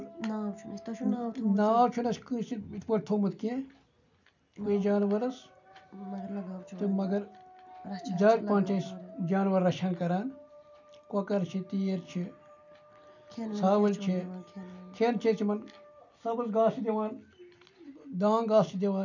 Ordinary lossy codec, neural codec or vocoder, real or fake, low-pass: none; none; real; 7.2 kHz